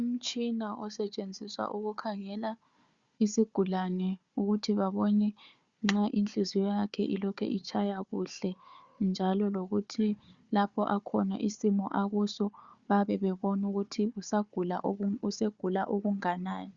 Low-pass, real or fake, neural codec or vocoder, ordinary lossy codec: 7.2 kHz; fake; codec, 16 kHz, 4 kbps, FunCodec, trained on Chinese and English, 50 frames a second; Opus, 64 kbps